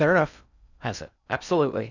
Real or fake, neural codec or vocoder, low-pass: fake; codec, 16 kHz in and 24 kHz out, 0.6 kbps, FocalCodec, streaming, 2048 codes; 7.2 kHz